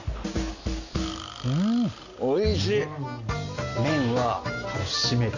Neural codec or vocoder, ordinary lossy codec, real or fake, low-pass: none; none; real; 7.2 kHz